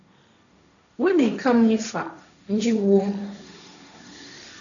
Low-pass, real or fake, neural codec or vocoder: 7.2 kHz; fake; codec, 16 kHz, 1.1 kbps, Voila-Tokenizer